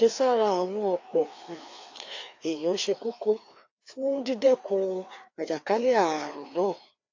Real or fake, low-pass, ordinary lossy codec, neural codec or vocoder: fake; 7.2 kHz; AAC, 48 kbps; codec, 16 kHz, 2 kbps, FreqCodec, larger model